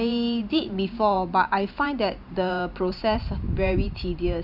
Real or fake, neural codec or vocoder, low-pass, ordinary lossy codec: fake; vocoder, 44.1 kHz, 128 mel bands every 512 samples, BigVGAN v2; 5.4 kHz; none